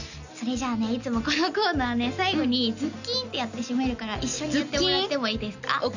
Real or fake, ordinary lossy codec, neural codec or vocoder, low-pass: real; none; none; 7.2 kHz